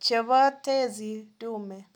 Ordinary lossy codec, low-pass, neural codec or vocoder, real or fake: none; none; none; real